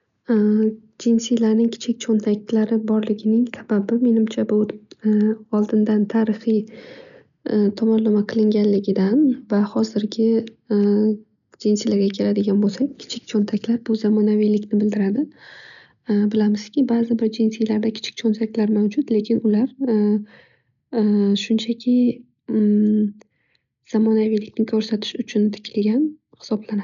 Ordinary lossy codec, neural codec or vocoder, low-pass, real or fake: none; none; 7.2 kHz; real